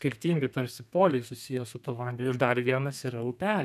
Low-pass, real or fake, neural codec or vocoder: 14.4 kHz; fake; codec, 32 kHz, 1.9 kbps, SNAC